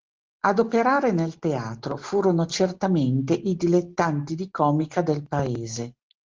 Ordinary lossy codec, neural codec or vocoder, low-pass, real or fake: Opus, 16 kbps; none; 7.2 kHz; real